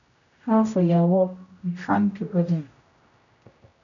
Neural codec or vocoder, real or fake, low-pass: codec, 16 kHz, 0.5 kbps, X-Codec, HuBERT features, trained on general audio; fake; 7.2 kHz